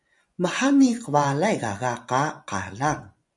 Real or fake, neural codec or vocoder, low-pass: fake; vocoder, 24 kHz, 100 mel bands, Vocos; 10.8 kHz